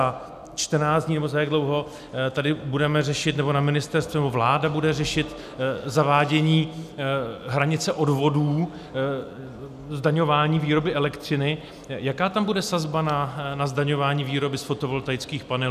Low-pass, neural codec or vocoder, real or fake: 14.4 kHz; none; real